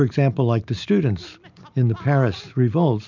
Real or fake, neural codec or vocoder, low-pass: real; none; 7.2 kHz